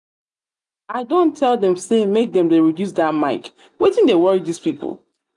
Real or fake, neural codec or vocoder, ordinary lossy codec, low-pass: real; none; none; 10.8 kHz